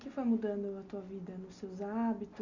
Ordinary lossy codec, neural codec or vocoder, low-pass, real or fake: none; none; 7.2 kHz; real